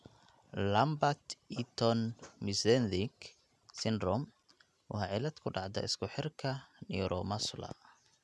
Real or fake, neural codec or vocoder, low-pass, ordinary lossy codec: real; none; none; none